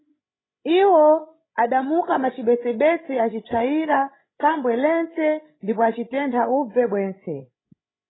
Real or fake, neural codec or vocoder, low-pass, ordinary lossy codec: fake; codec, 16 kHz, 16 kbps, FreqCodec, larger model; 7.2 kHz; AAC, 16 kbps